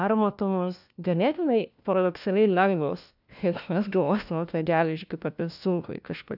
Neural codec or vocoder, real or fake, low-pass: codec, 16 kHz, 1 kbps, FunCodec, trained on LibriTTS, 50 frames a second; fake; 5.4 kHz